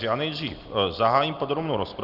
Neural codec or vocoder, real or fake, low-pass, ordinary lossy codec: none; real; 5.4 kHz; Opus, 32 kbps